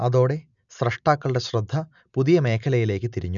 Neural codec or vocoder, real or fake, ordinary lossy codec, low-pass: none; real; none; 7.2 kHz